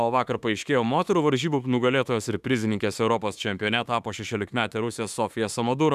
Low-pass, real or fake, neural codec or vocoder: 14.4 kHz; fake; autoencoder, 48 kHz, 32 numbers a frame, DAC-VAE, trained on Japanese speech